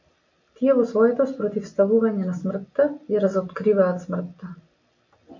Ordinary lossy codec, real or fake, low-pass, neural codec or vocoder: MP3, 48 kbps; real; 7.2 kHz; none